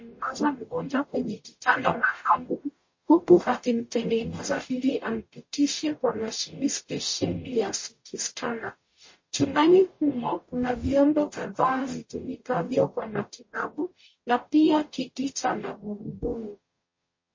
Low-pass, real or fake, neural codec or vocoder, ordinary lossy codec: 7.2 kHz; fake; codec, 44.1 kHz, 0.9 kbps, DAC; MP3, 32 kbps